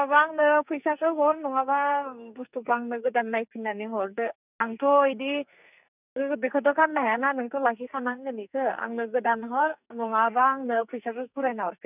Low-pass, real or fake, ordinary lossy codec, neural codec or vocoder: 3.6 kHz; fake; none; codec, 44.1 kHz, 2.6 kbps, SNAC